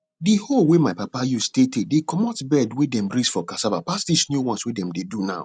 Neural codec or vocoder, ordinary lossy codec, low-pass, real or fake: none; none; 9.9 kHz; real